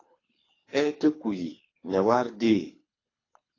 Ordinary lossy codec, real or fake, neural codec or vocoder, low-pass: AAC, 32 kbps; fake; codec, 24 kHz, 3 kbps, HILCodec; 7.2 kHz